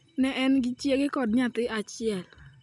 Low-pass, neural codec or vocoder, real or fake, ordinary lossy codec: 10.8 kHz; none; real; none